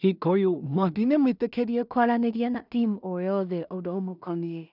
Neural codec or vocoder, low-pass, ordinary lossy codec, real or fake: codec, 16 kHz in and 24 kHz out, 0.4 kbps, LongCat-Audio-Codec, two codebook decoder; 5.4 kHz; none; fake